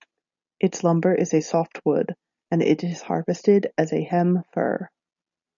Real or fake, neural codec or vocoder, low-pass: real; none; 7.2 kHz